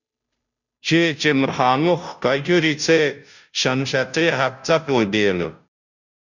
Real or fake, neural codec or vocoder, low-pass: fake; codec, 16 kHz, 0.5 kbps, FunCodec, trained on Chinese and English, 25 frames a second; 7.2 kHz